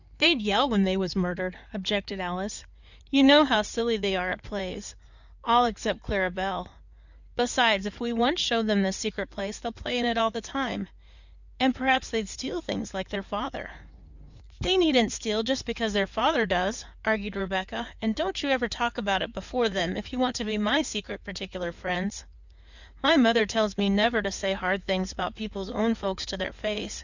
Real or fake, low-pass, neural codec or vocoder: fake; 7.2 kHz; codec, 16 kHz in and 24 kHz out, 2.2 kbps, FireRedTTS-2 codec